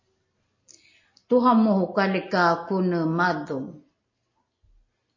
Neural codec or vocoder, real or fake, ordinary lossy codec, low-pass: none; real; MP3, 32 kbps; 7.2 kHz